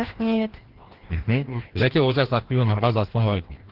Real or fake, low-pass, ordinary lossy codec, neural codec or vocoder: fake; 5.4 kHz; Opus, 16 kbps; codec, 16 kHz, 1 kbps, FreqCodec, larger model